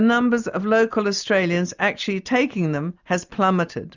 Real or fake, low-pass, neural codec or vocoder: real; 7.2 kHz; none